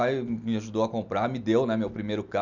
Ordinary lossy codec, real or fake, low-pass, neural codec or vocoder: none; real; 7.2 kHz; none